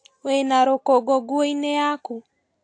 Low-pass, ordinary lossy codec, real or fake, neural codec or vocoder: 9.9 kHz; AAC, 48 kbps; real; none